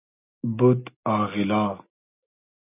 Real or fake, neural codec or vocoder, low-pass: real; none; 3.6 kHz